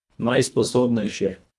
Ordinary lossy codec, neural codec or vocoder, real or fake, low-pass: none; codec, 24 kHz, 1.5 kbps, HILCodec; fake; none